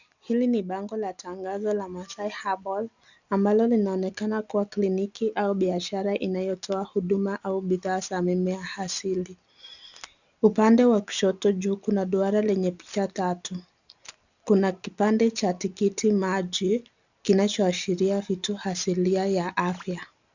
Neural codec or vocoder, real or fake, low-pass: none; real; 7.2 kHz